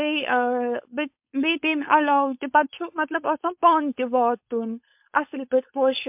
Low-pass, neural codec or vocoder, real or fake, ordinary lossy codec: 3.6 kHz; codec, 16 kHz, 4.8 kbps, FACodec; fake; MP3, 32 kbps